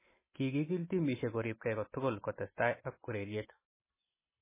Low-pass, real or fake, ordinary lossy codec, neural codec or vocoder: 3.6 kHz; real; MP3, 16 kbps; none